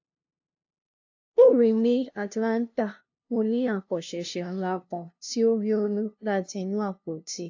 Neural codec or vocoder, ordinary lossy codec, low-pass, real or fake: codec, 16 kHz, 0.5 kbps, FunCodec, trained on LibriTTS, 25 frames a second; AAC, 48 kbps; 7.2 kHz; fake